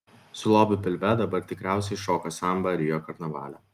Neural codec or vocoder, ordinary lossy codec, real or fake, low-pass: none; Opus, 32 kbps; real; 14.4 kHz